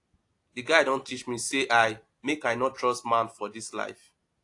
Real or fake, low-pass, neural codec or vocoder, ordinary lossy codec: real; 10.8 kHz; none; AAC, 48 kbps